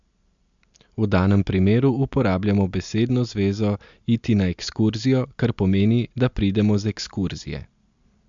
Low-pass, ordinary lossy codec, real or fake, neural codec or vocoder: 7.2 kHz; MP3, 64 kbps; real; none